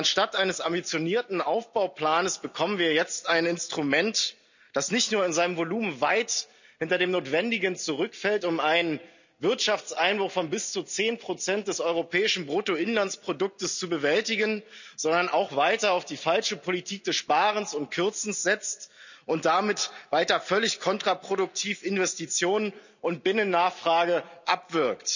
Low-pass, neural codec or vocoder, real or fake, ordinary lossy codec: 7.2 kHz; none; real; none